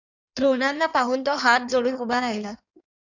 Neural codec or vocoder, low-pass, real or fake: codec, 16 kHz in and 24 kHz out, 1.1 kbps, FireRedTTS-2 codec; 7.2 kHz; fake